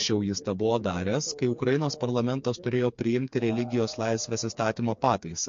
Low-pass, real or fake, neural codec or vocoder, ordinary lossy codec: 7.2 kHz; fake; codec, 16 kHz, 4 kbps, FreqCodec, smaller model; MP3, 48 kbps